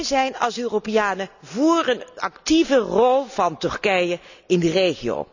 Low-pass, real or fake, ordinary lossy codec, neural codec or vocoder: 7.2 kHz; real; none; none